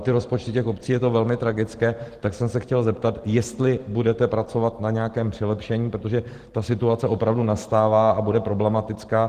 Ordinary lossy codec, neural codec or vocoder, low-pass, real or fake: Opus, 16 kbps; none; 14.4 kHz; real